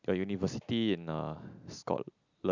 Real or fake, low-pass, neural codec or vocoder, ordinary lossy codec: real; 7.2 kHz; none; none